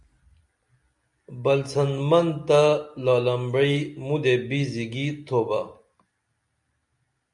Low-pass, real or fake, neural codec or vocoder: 10.8 kHz; real; none